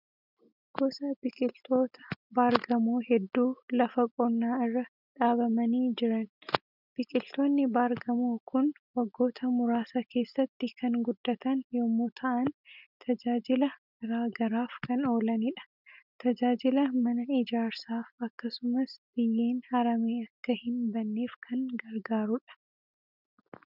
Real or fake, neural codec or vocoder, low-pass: real; none; 5.4 kHz